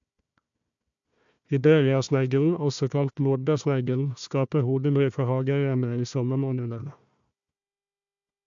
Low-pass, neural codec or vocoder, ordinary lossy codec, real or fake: 7.2 kHz; codec, 16 kHz, 1 kbps, FunCodec, trained on Chinese and English, 50 frames a second; AAC, 64 kbps; fake